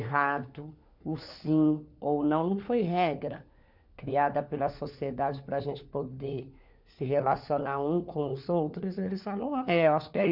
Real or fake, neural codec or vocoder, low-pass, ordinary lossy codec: fake; codec, 16 kHz, 4 kbps, FunCodec, trained on LibriTTS, 50 frames a second; 5.4 kHz; none